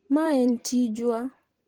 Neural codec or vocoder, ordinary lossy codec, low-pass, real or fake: vocoder, 44.1 kHz, 128 mel bands every 512 samples, BigVGAN v2; Opus, 16 kbps; 19.8 kHz; fake